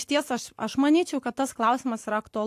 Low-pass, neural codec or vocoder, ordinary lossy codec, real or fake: 14.4 kHz; none; AAC, 64 kbps; real